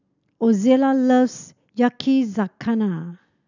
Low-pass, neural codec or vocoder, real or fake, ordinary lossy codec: 7.2 kHz; none; real; none